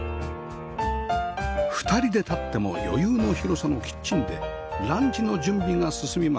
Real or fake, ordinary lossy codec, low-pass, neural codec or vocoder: real; none; none; none